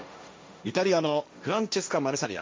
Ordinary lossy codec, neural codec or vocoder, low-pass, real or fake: none; codec, 16 kHz, 1.1 kbps, Voila-Tokenizer; none; fake